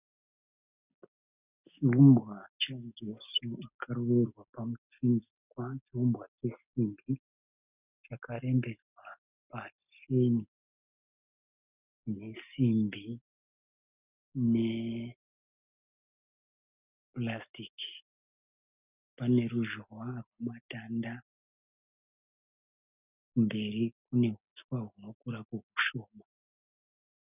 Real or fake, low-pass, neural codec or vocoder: real; 3.6 kHz; none